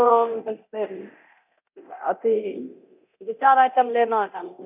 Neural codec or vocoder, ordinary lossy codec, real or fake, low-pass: codec, 24 kHz, 0.9 kbps, DualCodec; none; fake; 3.6 kHz